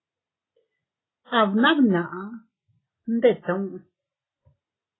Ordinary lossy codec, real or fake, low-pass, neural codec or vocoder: AAC, 16 kbps; real; 7.2 kHz; none